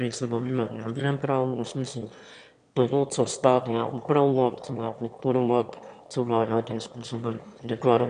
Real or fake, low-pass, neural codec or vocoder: fake; 9.9 kHz; autoencoder, 22.05 kHz, a latent of 192 numbers a frame, VITS, trained on one speaker